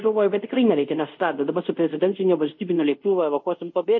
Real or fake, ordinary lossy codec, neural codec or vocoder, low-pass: fake; MP3, 32 kbps; codec, 24 kHz, 0.5 kbps, DualCodec; 7.2 kHz